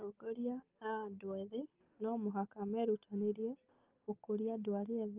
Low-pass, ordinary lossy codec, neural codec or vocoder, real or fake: 3.6 kHz; Opus, 24 kbps; none; real